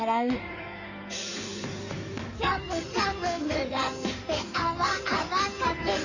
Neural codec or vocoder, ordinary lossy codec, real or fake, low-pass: codec, 16 kHz in and 24 kHz out, 2.2 kbps, FireRedTTS-2 codec; none; fake; 7.2 kHz